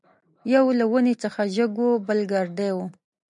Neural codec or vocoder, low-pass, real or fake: none; 10.8 kHz; real